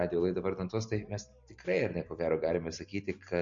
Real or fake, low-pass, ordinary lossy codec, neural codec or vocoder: real; 7.2 kHz; MP3, 48 kbps; none